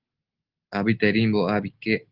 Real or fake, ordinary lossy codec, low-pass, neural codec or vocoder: real; Opus, 16 kbps; 5.4 kHz; none